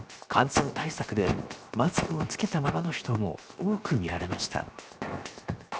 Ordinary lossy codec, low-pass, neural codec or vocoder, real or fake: none; none; codec, 16 kHz, 0.7 kbps, FocalCodec; fake